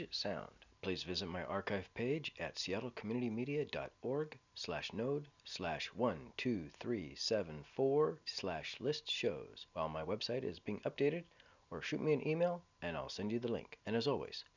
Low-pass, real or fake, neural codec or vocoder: 7.2 kHz; real; none